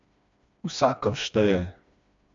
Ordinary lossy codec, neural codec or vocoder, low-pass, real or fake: MP3, 48 kbps; codec, 16 kHz, 2 kbps, FreqCodec, smaller model; 7.2 kHz; fake